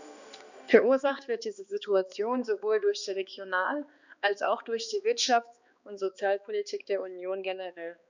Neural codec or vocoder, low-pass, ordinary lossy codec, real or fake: codec, 16 kHz, 2 kbps, X-Codec, HuBERT features, trained on balanced general audio; 7.2 kHz; none; fake